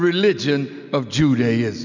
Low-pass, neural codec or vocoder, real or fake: 7.2 kHz; none; real